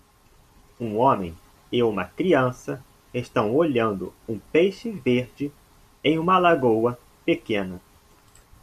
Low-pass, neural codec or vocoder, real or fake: 14.4 kHz; none; real